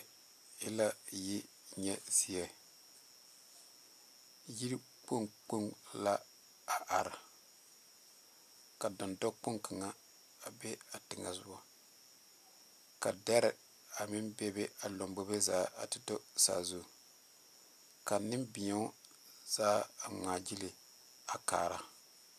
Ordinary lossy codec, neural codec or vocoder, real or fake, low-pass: MP3, 96 kbps; none; real; 14.4 kHz